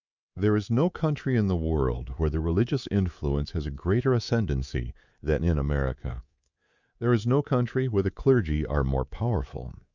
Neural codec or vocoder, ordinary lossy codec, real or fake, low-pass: codec, 24 kHz, 3.1 kbps, DualCodec; Opus, 64 kbps; fake; 7.2 kHz